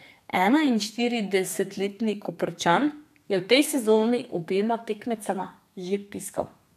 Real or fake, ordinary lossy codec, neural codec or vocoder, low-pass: fake; none; codec, 32 kHz, 1.9 kbps, SNAC; 14.4 kHz